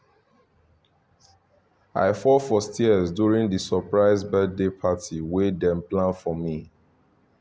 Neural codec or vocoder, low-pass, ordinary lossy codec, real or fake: none; none; none; real